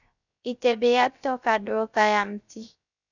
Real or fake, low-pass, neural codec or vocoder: fake; 7.2 kHz; codec, 16 kHz, 0.3 kbps, FocalCodec